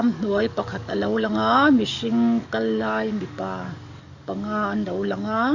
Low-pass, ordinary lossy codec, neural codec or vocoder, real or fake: 7.2 kHz; none; codec, 44.1 kHz, 7.8 kbps, DAC; fake